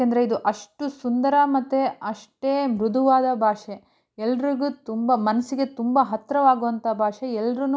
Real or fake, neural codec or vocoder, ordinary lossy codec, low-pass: real; none; none; none